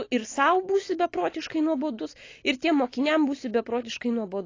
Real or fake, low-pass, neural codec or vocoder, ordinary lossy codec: real; 7.2 kHz; none; AAC, 32 kbps